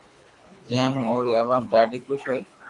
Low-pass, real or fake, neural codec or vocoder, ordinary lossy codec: 10.8 kHz; fake; codec, 24 kHz, 3 kbps, HILCodec; MP3, 64 kbps